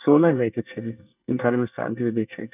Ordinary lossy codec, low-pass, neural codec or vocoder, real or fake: none; 3.6 kHz; codec, 24 kHz, 1 kbps, SNAC; fake